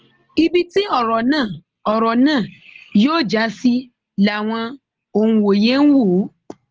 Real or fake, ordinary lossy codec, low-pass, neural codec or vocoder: real; Opus, 16 kbps; 7.2 kHz; none